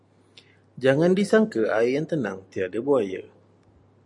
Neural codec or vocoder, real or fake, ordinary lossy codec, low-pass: none; real; MP3, 64 kbps; 10.8 kHz